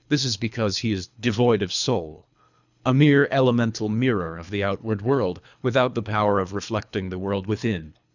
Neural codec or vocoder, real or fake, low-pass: codec, 24 kHz, 3 kbps, HILCodec; fake; 7.2 kHz